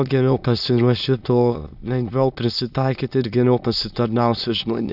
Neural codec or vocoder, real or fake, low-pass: autoencoder, 22.05 kHz, a latent of 192 numbers a frame, VITS, trained on many speakers; fake; 5.4 kHz